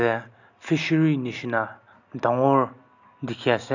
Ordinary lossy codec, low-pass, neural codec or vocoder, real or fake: none; 7.2 kHz; none; real